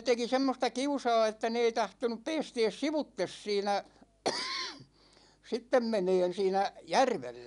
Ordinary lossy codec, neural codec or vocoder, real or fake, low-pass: none; none; real; 10.8 kHz